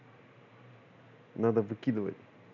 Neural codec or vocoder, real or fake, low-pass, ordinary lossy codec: none; real; 7.2 kHz; none